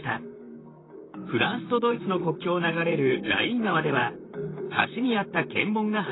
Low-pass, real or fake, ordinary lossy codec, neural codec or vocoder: 7.2 kHz; fake; AAC, 16 kbps; vocoder, 44.1 kHz, 128 mel bands, Pupu-Vocoder